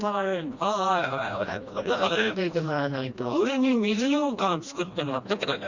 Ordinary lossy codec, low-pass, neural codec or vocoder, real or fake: Opus, 64 kbps; 7.2 kHz; codec, 16 kHz, 1 kbps, FreqCodec, smaller model; fake